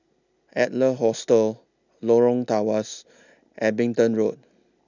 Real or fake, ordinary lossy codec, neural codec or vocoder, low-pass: real; none; none; 7.2 kHz